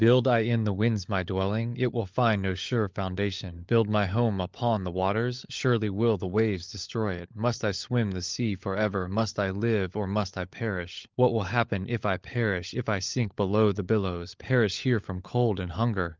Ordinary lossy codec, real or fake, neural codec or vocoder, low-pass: Opus, 32 kbps; fake; codec, 16 kHz, 16 kbps, FunCodec, trained on LibriTTS, 50 frames a second; 7.2 kHz